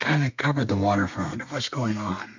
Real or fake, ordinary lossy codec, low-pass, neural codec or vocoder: fake; MP3, 64 kbps; 7.2 kHz; codec, 32 kHz, 1.9 kbps, SNAC